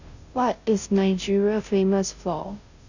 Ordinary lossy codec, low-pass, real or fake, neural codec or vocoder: none; 7.2 kHz; fake; codec, 16 kHz, 0.5 kbps, FunCodec, trained on Chinese and English, 25 frames a second